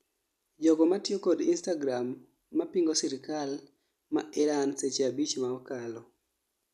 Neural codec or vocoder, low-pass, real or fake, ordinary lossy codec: none; 14.4 kHz; real; none